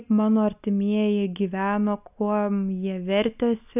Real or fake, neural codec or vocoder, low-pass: real; none; 3.6 kHz